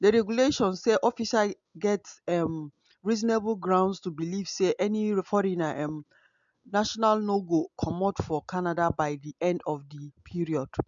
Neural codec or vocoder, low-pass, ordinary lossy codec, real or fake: none; 7.2 kHz; MP3, 64 kbps; real